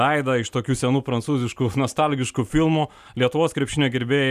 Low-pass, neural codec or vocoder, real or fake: 14.4 kHz; none; real